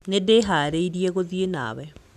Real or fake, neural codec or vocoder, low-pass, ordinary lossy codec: real; none; 14.4 kHz; none